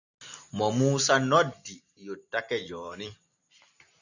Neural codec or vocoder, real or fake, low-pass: vocoder, 44.1 kHz, 128 mel bands every 256 samples, BigVGAN v2; fake; 7.2 kHz